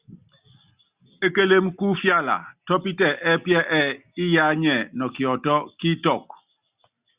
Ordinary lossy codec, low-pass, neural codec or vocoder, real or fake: Opus, 64 kbps; 3.6 kHz; none; real